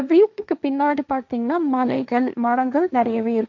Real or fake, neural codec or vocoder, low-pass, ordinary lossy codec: fake; codec, 16 kHz, 1.1 kbps, Voila-Tokenizer; 7.2 kHz; none